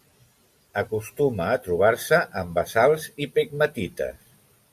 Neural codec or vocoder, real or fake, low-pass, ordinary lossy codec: none; real; 14.4 kHz; AAC, 96 kbps